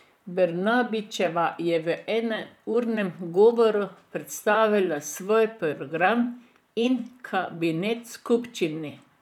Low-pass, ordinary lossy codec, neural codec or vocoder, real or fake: 19.8 kHz; none; vocoder, 44.1 kHz, 128 mel bands, Pupu-Vocoder; fake